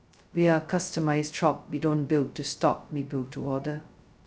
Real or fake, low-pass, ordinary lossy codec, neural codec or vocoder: fake; none; none; codec, 16 kHz, 0.2 kbps, FocalCodec